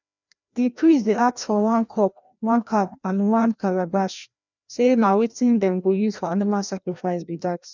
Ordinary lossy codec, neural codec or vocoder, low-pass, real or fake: none; codec, 16 kHz, 1 kbps, FreqCodec, larger model; 7.2 kHz; fake